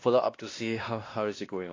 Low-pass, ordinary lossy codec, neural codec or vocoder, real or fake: 7.2 kHz; AAC, 32 kbps; codec, 16 kHz, 1 kbps, X-Codec, WavLM features, trained on Multilingual LibriSpeech; fake